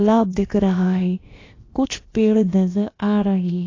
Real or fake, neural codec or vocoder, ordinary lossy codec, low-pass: fake; codec, 16 kHz, about 1 kbps, DyCAST, with the encoder's durations; AAC, 32 kbps; 7.2 kHz